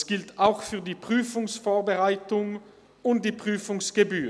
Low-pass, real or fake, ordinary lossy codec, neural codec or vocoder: none; real; none; none